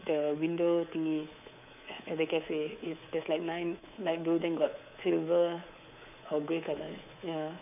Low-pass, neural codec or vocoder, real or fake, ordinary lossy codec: 3.6 kHz; codec, 24 kHz, 3.1 kbps, DualCodec; fake; none